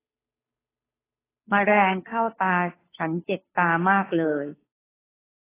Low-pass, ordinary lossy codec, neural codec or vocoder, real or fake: 3.6 kHz; AAC, 16 kbps; codec, 16 kHz, 2 kbps, FunCodec, trained on Chinese and English, 25 frames a second; fake